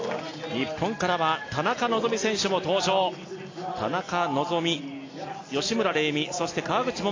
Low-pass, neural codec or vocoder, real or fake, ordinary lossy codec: 7.2 kHz; none; real; AAC, 32 kbps